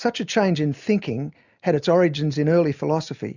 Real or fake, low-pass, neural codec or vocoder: real; 7.2 kHz; none